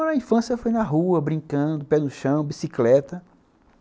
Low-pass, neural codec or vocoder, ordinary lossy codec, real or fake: none; none; none; real